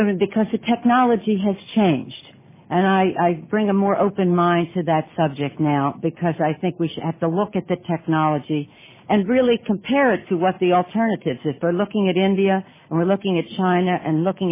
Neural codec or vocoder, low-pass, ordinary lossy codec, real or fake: none; 3.6 kHz; MP3, 16 kbps; real